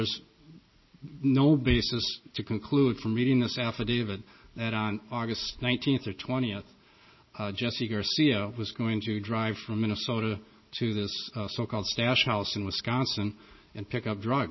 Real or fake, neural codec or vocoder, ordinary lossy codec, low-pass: real; none; MP3, 24 kbps; 7.2 kHz